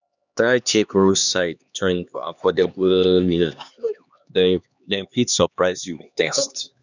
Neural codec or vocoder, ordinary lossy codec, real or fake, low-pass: codec, 16 kHz, 2 kbps, X-Codec, HuBERT features, trained on LibriSpeech; none; fake; 7.2 kHz